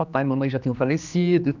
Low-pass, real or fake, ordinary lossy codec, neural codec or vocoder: 7.2 kHz; fake; none; codec, 16 kHz, 2 kbps, X-Codec, HuBERT features, trained on general audio